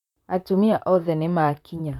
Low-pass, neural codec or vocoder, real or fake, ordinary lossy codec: 19.8 kHz; vocoder, 44.1 kHz, 128 mel bands, Pupu-Vocoder; fake; none